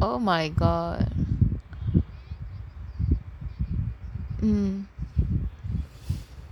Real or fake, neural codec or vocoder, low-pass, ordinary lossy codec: real; none; 19.8 kHz; none